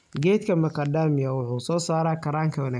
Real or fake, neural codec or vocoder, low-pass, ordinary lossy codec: real; none; 9.9 kHz; none